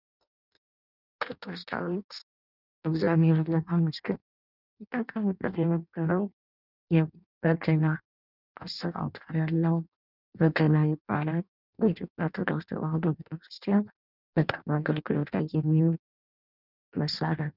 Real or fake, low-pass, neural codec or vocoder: fake; 5.4 kHz; codec, 16 kHz in and 24 kHz out, 0.6 kbps, FireRedTTS-2 codec